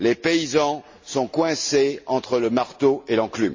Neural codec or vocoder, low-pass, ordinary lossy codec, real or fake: none; 7.2 kHz; MP3, 32 kbps; real